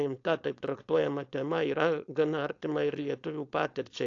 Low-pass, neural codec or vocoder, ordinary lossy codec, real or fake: 7.2 kHz; codec, 16 kHz, 4.8 kbps, FACodec; AAC, 48 kbps; fake